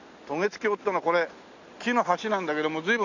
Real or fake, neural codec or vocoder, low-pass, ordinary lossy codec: real; none; 7.2 kHz; none